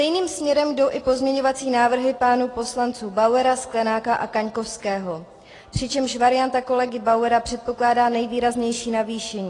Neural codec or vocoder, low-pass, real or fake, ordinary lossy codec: none; 10.8 kHz; real; AAC, 32 kbps